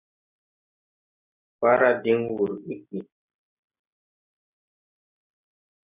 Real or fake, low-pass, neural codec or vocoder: real; 3.6 kHz; none